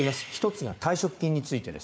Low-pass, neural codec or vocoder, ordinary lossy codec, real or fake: none; codec, 16 kHz, 4 kbps, FunCodec, trained on Chinese and English, 50 frames a second; none; fake